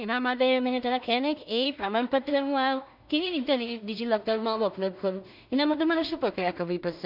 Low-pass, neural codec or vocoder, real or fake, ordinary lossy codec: 5.4 kHz; codec, 16 kHz in and 24 kHz out, 0.4 kbps, LongCat-Audio-Codec, two codebook decoder; fake; none